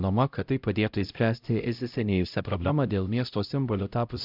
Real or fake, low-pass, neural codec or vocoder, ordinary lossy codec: fake; 5.4 kHz; codec, 16 kHz, 0.5 kbps, X-Codec, HuBERT features, trained on LibriSpeech; AAC, 48 kbps